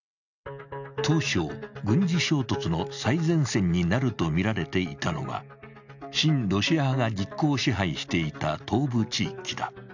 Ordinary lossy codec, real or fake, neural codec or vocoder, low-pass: none; real; none; 7.2 kHz